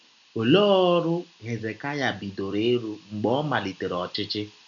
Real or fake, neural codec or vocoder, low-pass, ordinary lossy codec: real; none; 7.2 kHz; none